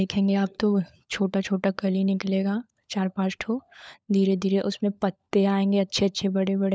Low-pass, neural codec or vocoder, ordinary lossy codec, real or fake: none; codec, 16 kHz, 16 kbps, FunCodec, trained on LibriTTS, 50 frames a second; none; fake